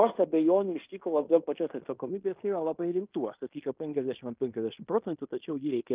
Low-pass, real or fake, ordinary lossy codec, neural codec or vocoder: 3.6 kHz; fake; Opus, 24 kbps; codec, 16 kHz in and 24 kHz out, 0.9 kbps, LongCat-Audio-Codec, fine tuned four codebook decoder